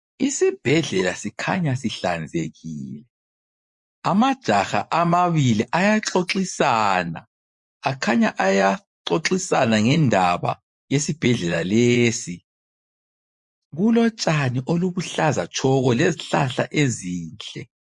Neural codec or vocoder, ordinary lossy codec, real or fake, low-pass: vocoder, 24 kHz, 100 mel bands, Vocos; MP3, 48 kbps; fake; 10.8 kHz